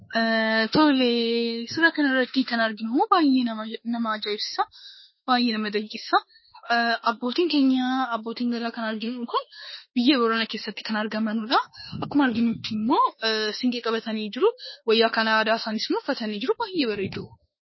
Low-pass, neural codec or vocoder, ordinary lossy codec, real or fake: 7.2 kHz; autoencoder, 48 kHz, 32 numbers a frame, DAC-VAE, trained on Japanese speech; MP3, 24 kbps; fake